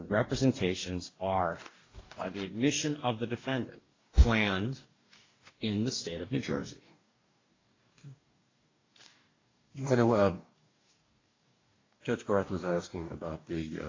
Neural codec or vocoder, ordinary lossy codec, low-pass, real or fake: codec, 44.1 kHz, 2.6 kbps, DAC; AAC, 32 kbps; 7.2 kHz; fake